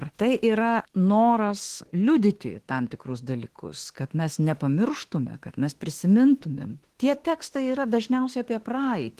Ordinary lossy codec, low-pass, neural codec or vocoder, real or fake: Opus, 16 kbps; 14.4 kHz; autoencoder, 48 kHz, 32 numbers a frame, DAC-VAE, trained on Japanese speech; fake